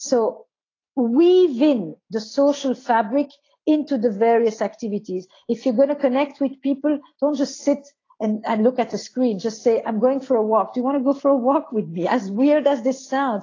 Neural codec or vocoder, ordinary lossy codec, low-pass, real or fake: none; AAC, 32 kbps; 7.2 kHz; real